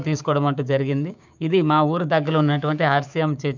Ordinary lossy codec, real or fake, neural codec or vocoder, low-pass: none; fake; codec, 16 kHz, 6 kbps, DAC; 7.2 kHz